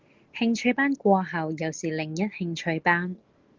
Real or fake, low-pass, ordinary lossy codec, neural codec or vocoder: real; 7.2 kHz; Opus, 16 kbps; none